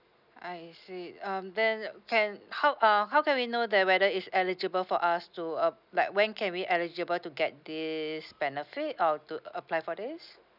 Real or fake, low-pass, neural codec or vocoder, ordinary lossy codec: real; 5.4 kHz; none; none